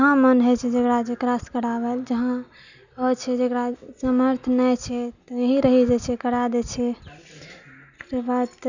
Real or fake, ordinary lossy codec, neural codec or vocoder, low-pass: real; none; none; 7.2 kHz